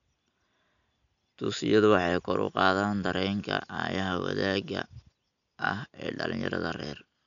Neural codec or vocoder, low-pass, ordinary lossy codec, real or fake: none; 7.2 kHz; none; real